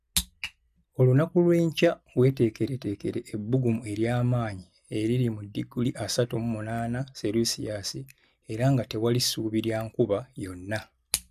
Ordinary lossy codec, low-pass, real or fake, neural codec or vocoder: none; 14.4 kHz; real; none